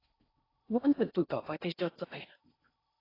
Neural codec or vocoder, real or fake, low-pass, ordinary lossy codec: codec, 16 kHz in and 24 kHz out, 0.6 kbps, FocalCodec, streaming, 4096 codes; fake; 5.4 kHz; AAC, 24 kbps